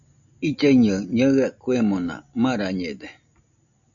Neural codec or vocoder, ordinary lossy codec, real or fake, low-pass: none; MP3, 96 kbps; real; 7.2 kHz